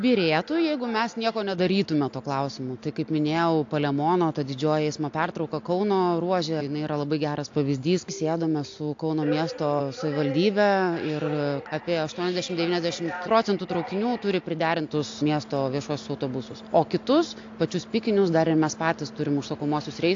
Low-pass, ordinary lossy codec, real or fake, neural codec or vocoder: 7.2 kHz; AAC, 48 kbps; real; none